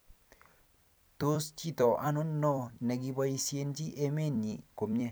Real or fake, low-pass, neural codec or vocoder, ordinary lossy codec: fake; none; vocoder, 44.1 kHz, 128 mel bands every 256 samples, BigVGAN v2; none